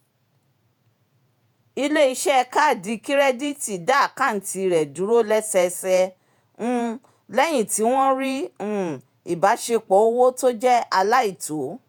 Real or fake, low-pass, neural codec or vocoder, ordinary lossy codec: fake; none; vocoder, 48 kHz, 128 mel bands, Vocos; none